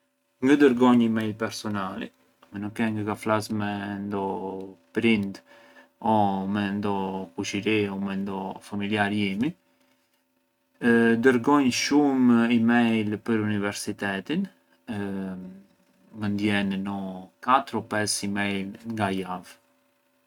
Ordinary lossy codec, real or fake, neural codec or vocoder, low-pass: none; real; none; 19.8 kHz